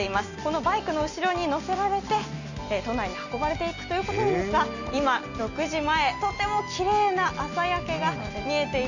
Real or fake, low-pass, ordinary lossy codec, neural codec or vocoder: real; 7.2 kHz; none; none